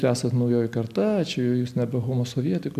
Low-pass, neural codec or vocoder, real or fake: 14.4 kHz; none; real